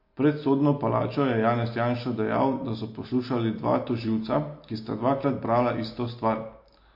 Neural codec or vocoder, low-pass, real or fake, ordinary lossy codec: none; 5.4 kHz; real; MP3, 32 kbps